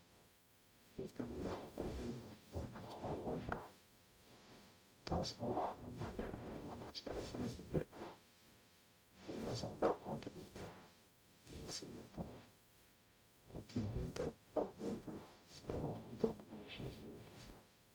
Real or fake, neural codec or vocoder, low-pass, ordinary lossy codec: fake; codec, 44.1 kHz, 0.9 kbps, DAC; none; none